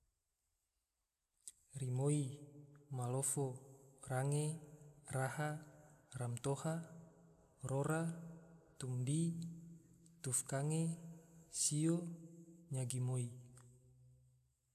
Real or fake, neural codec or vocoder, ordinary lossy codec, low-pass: real; none; none; 14.4 kHz